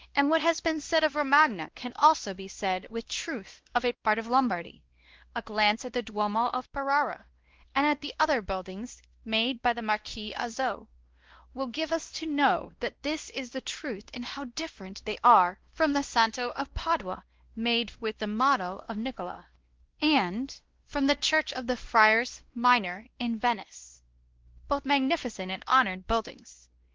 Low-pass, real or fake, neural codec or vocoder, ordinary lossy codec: 7.2 kHz; fake; codec, 16 kHz, 1 kbps, X-Codec, WavLM features, trained on Multilingual LibriSpeech; Opus, 16 kbps